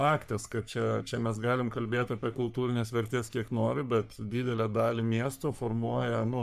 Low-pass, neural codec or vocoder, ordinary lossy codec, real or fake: 14.4 kHz; codec, 44.1 kHz, 3.4 kbps, Pupu-Codec; MP3, 96 kbps; fake